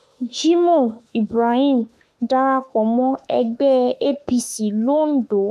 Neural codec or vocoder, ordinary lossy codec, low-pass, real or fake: autoencoder, 48 kHz, 32 numbers a frame, DAC-VAE, trained on Japanese speech; MP3, 96 kbps; 14.4 kHz; fake